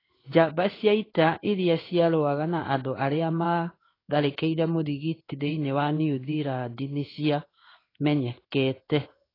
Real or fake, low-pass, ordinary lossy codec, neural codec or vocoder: fake; 5.4 kHz; AAC, 24 kbps; codec, 16 kHz in and 24 kHz out, 1 kbps, XY-Tokenizer